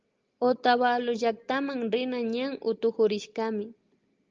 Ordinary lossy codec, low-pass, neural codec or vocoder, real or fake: Opus, 24 kbps; 7.2 kHz; none; real